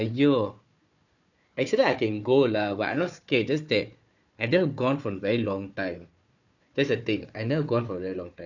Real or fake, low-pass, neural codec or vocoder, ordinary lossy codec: fake; 7.2 kHz; codec, 16 kHz, 4 kbps, FunCodec, trained on Chinese and English, 50 frames a second; none